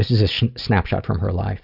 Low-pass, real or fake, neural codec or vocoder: 5.4 kHz; real; none